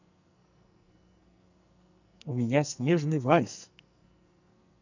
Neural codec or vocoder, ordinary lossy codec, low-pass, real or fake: codec, 44.1 kHz, 2.6 kbps, SNAC; none; 7.2 kHz; fake